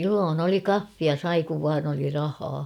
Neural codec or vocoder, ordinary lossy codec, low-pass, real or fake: none; none; 19.8 kHz; real